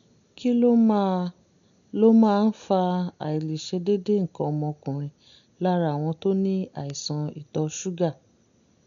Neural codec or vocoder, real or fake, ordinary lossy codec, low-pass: none; real; none; 7.2 kHz